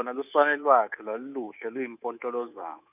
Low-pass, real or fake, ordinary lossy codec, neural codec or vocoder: 3.6 kHz; fake; none; codec, 24 kHz, 3.1 kbps, DualCodec